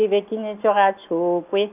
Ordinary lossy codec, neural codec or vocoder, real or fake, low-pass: none; none; real; 3.6 kHz